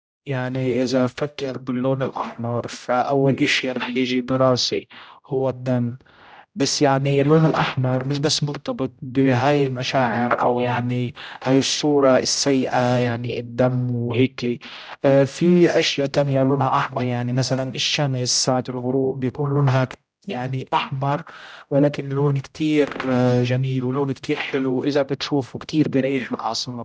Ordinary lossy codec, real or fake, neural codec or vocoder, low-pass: none; fake; codec, 16 kHz, 0.5 kbps, X-Codec, HuBERT features, trained on general audio; none